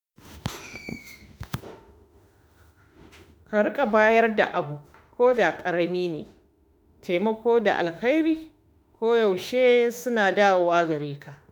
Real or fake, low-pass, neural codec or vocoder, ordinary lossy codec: fake; none; autoencoder, 48 kHz, 32 numbers a frame, DAC-VAE, trained on Japanese speech; none